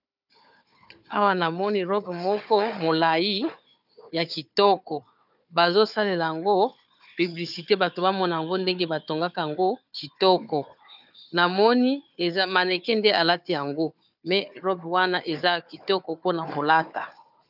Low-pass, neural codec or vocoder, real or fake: 5.4 kHz; codec, 16 kHz, 4 kbps, FunCodec, trained on Chinese and English, 50 frames a second; fake